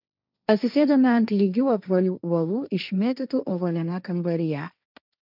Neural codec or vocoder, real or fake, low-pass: codec, 16 kHz, 1.1 kbps, Voila-Tokenizer; fake; 5.4 kHz